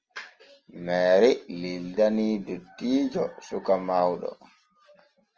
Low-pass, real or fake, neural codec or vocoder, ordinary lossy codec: 7.2 kHz; real; none; Opus, 24 kbps